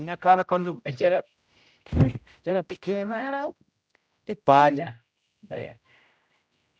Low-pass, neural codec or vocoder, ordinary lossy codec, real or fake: none; codec, 16 kHz, 0.5 kbps, X-Codec, HuBERT features, trained on general audio; none; fake